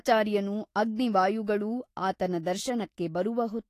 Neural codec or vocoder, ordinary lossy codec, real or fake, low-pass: autoencoder, 48 kHz, 128 numbers a frame, DAC-VAE, trained on Japanese speech; AAC, 48 kbps; fake; 14.4 kHz